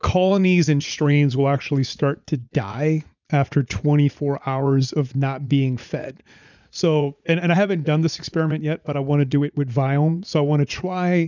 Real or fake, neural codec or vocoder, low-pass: fake; vocoder, 44.1 kHz, 80 mel bands, Vocos; 7.2 kHz